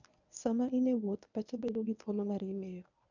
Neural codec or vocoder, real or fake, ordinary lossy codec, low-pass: codec, 24 kHz, 0.9 kbps, WavTokenizer, medium speech release version 1; fake; none; 7.2 kHz